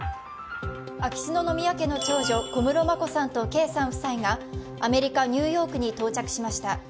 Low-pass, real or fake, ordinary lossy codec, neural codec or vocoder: none; real; none; none